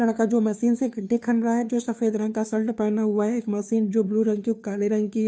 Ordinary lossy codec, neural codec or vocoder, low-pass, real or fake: none; codec, 16 kHz, 2 kbps, FunCodec, trained on Chinese and English, 25 frames a second; none; fake